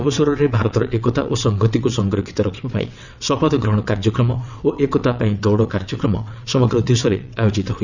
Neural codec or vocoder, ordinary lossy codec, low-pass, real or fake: vocoder, 22.05 kHz, 80 mel bands, WaveNeXt; none; 7.2 kHz; fake